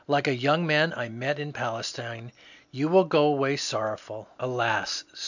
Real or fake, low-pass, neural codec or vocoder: real; 7.2 kHz; none